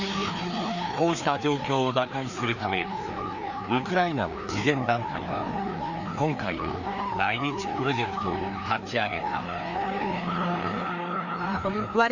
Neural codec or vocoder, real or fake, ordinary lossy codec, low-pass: codec, 16 kHz, 2 kbps, FreqCodec, larger model; fake; none; 7.2 kHz